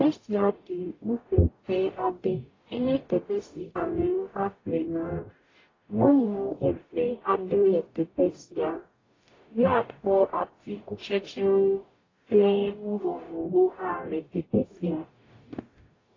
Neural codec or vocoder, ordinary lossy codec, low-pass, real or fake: codec, 44.1 kHz, 0.9 kbps, DAC; AAC, 32 kbps; 7.2 kHz; fake